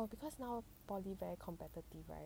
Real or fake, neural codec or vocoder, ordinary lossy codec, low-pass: real; none; none; none